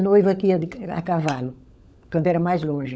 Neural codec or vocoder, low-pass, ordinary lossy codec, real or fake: codec, 16 kHz, 16 kbps, FunCodec, trained on LibriTTS, 50 frames a second; none; none; fake